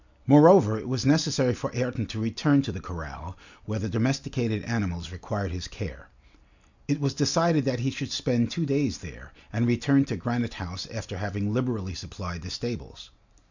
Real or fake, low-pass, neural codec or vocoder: real; 7.2 kHz; none